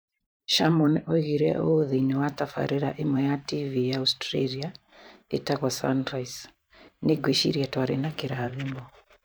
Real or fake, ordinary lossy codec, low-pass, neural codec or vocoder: fake; none; none; vocoder, 44.1 kHz, 128 mel bands every 512 samples, BigVGAN v2